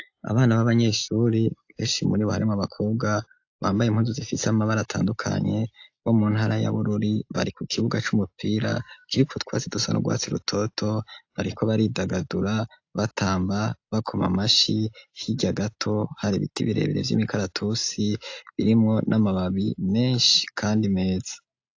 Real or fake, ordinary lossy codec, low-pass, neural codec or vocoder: real; AAC, 48 kbps; 7.2 kHz; none